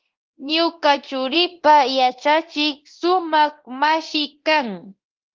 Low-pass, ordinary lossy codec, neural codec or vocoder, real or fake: 7.2 kHz; Opus, 16 kbps; codec, 24 kHz, 0.9 kbps, DualCodec; fake